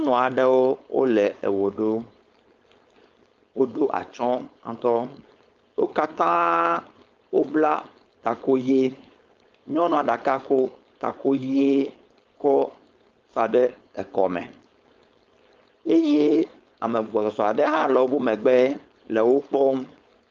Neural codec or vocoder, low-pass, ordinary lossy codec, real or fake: codec, 16 kHz, 4.8 kbps, FACodec; 7.2 kHz; Opus, 24 kbps; fake